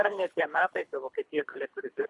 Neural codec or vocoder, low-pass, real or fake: codec, 24 kHz, 3 kbps, HILCodec; 10.8 kHz; fake